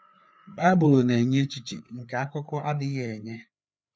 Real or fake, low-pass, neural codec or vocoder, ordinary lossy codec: fake; none; codec, 16 kHz, 4 kbps, FreqCodec, larger model; none